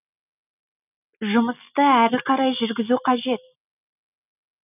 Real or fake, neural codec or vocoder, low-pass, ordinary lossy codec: real; none; 3.6 kHz; none